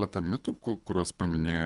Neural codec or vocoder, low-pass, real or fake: codec, 24 kHz, 3 kbps, HILCodec; 10.8 kHz; fake